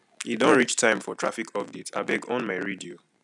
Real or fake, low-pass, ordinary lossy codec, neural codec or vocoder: real; 10.8 kHz; none; none